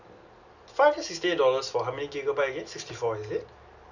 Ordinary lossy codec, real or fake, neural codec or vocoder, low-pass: none; real; none; 7.2 kHz